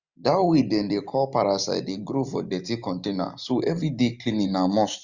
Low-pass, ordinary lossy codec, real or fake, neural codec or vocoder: 7.2 kHz; Opus, 64 kbps; real; none